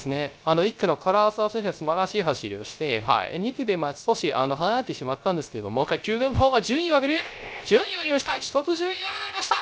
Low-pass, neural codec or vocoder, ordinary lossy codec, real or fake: none; codec, 16 kHz, 0.3 kbps, FocalCodec; none; fake